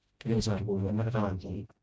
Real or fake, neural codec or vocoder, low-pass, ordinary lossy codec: fake; codec, 16 kHz, 0.5 kbps, FreqCodec, smaller model; none; none